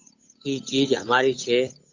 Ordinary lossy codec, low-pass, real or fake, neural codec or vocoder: AAC, 48 kbps; 7.2 kHz; fake; codec, 16 kHz, 8 kbps, FunCodec, trained on Chinese and English, 25 frames a second